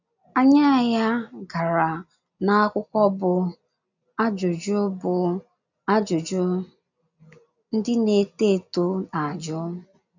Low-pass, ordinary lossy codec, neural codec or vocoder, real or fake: 7.2 kHz; none; none; real